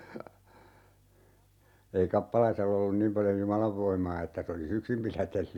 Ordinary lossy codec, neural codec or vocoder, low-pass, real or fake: none; none; 19.8 kHz; real